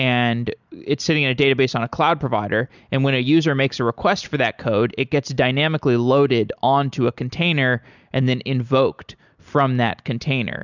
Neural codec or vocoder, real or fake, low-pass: none; real; 7.2 kHz